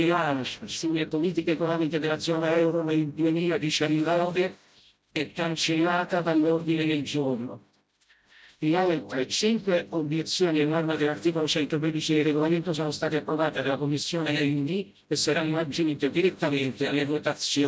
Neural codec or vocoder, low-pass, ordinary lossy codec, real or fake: codec, 16 kHz, 0.5 kbps, FreqCodec, smaller model; none; none; fake